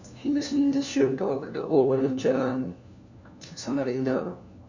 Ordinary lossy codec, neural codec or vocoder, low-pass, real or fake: none; codec, 16 kHz, 1 kbps, FunCodec, trained on LibriTTS, 50 frames a second; 7.2 kHz; fake